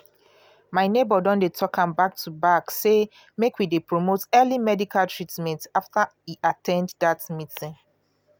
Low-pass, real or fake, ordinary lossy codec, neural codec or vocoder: none; real; none; none